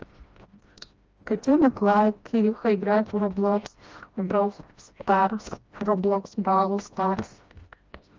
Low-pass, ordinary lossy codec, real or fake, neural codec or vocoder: 7.2 kHz; Opus, 24 kbps; fake; codec, 16 kHz, 1 kbps, FreqCodec, smaller model